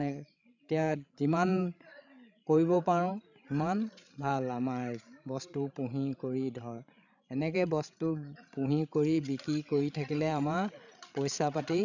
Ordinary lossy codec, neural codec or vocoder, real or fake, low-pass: none; codec, 16 kHz, 16 kbps, FreqCodec, larger model; fake; 7.2 kHz